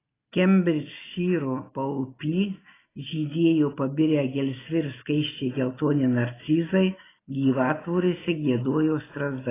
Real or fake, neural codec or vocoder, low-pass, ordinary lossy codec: real; none; 3.6 kHz; AAC, 16 kbps